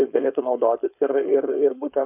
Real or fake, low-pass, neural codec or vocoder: fake; 3.6 kHz; codec, 16 kHz, 4.8 kbps, FACodec